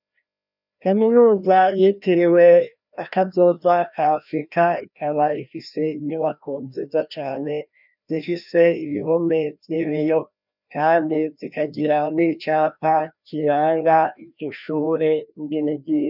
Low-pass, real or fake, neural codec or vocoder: 5.4 kHz; fake; codec, 16 kHz, 1 kbps, FreqCodec, larger model